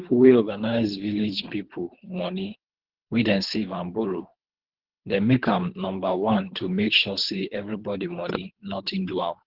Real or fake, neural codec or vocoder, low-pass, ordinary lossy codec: fake; codec, 24 kHz, 3 kbps, HILCodec; 5.4 kHz; Opus, 16 kbps